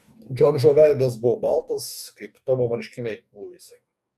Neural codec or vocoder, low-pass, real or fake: codec, 44.1 kHz, 2.6 kbps, DAC; 14.4 kHz; fake